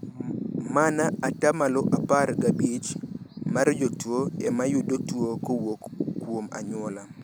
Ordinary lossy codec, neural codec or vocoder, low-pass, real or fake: none; none; none; real